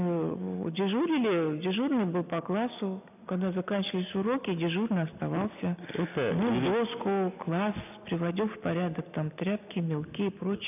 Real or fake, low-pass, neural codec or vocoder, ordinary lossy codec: real; 3.6 kHz; none; none